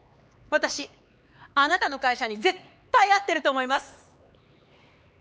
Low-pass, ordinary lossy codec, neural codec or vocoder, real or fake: none; none; codec, 16 kHz, 4 kbps, X-Codec, HuBERT features, trained on LibriSpeech; fake